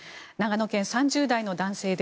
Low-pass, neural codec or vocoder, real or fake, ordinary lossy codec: none; none; real; none